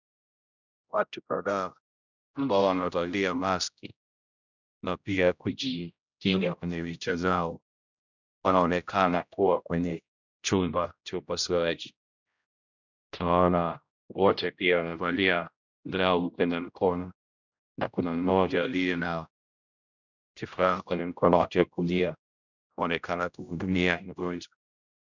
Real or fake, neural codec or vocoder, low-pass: fake; codec, 16 kHz, 0.5 kbps, X-Codec, HuBERT features, trained on general audio; 7.2 kHz